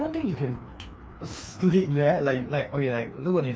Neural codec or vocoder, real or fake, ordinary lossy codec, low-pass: codec, 16 kHz, 2 kbps, FreqCodec, larger model; fake; none; none